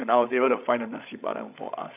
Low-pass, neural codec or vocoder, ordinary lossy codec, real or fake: 3.6 kHz; codec, 16 kHz, 16 kbps, FreqCodec, larger model; none; fake